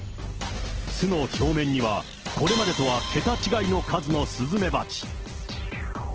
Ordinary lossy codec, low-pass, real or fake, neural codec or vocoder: Opus, 16 kbps; 7.2 kHz; real; none